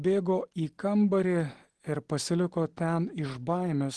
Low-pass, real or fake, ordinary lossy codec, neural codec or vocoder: 10.8 kHz; real; Opus, 16 kbps; none